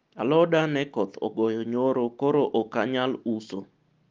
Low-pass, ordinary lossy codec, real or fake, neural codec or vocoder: 7.2 kHz; Opus, 32 kbps; real; none